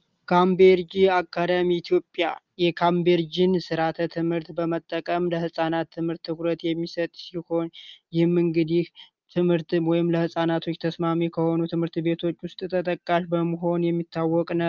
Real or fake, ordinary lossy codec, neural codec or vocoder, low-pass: real; Opus, 24 kbps; none; 7.2 kHz